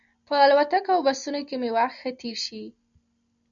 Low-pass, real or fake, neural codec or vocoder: 7.2 kHz; real; none